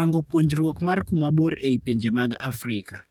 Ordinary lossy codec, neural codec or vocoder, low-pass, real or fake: none; codec, 44.1 kHz, 2.6 kbps, DAC; 19.8 kHz; fake